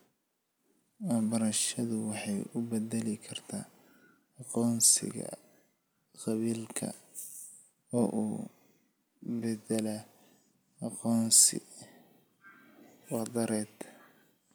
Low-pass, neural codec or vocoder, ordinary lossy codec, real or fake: none; none; none; real